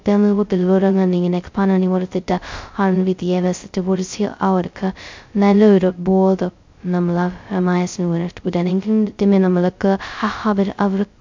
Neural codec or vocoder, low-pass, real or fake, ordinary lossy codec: codec, 16 kHz, 0.2 kbps, FocalCodec; 7.2 kHz; fake; MP3, 64 kbps